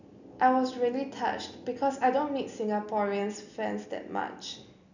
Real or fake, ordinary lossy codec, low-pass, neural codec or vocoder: real; none; 7.2 kHz; none